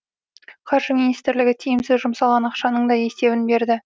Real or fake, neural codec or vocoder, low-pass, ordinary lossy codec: real; none; none; none